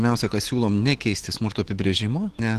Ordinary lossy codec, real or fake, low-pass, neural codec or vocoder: Opus, 16 kbps; fake; 14.4 kHz; autoencoder, 48 kHz, 128 numbers a frame, DAC-VAE, trained on Japanese speech